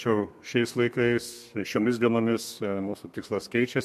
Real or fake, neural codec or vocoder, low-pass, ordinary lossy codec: fake; codec, 32 kHz, 1.9 kbps, SNAC; 14.4 kHz; MP3, 64 kbps